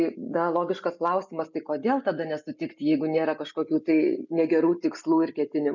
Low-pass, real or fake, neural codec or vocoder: 7.2 kHz; real; none